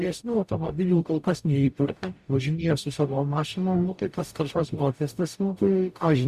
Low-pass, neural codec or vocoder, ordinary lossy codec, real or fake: 14.4 kHz; codec, 44.1 kHz, 0.9 kbps, DAC; Opus, 64 kbps; fake